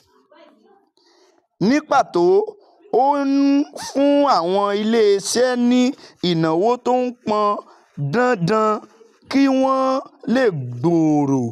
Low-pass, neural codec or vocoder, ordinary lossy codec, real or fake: 14.4 kHz; none; none; real